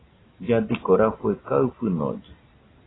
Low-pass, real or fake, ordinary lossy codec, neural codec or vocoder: 7.2 kHz; real; AAC, 16 kbps; none